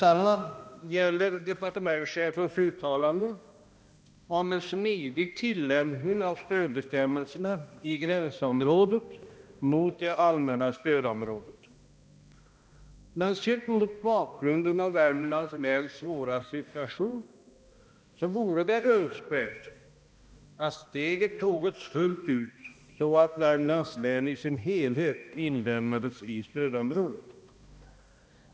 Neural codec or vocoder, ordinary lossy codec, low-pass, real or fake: codec, 16 kHz, 1 kbps, X-Codec, HuBERT features, trained on balanced general audio; none; none; fake